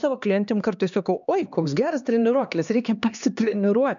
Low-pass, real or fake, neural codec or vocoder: 7.2 kHz; fake; codec, 16 kHz, 2 kbps, X-Codec, HuBERT features, trained on LibriSpeech